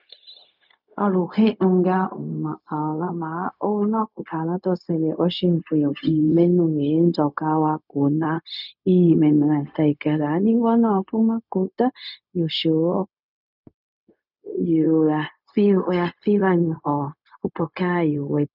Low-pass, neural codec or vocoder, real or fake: 5.4 kHz; codec, 16 kHz, 0.4 kbps, LongCat-Audio-Codec; fake